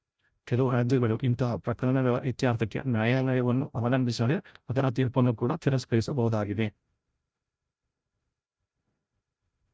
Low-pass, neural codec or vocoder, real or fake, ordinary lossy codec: none; codec, 16 kHz, 0.5 kbps, FreqCodec, larger model; fake; none